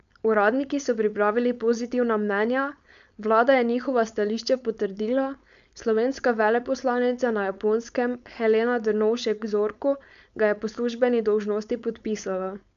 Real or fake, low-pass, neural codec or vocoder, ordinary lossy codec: fake; 7.2 kHz; codec, 16 kHz, 4.8 kbps, FACodec; none